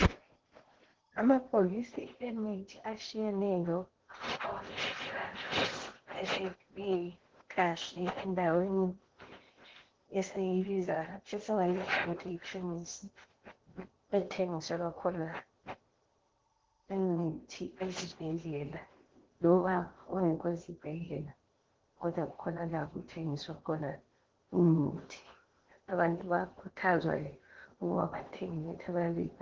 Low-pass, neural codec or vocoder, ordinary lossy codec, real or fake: 7.2 kHz; codec, 16 kHz in and 24 kHz out, 0.8 kbps, FocalCodec, streaming, 65536 codes; Opus, 16 kbps; fake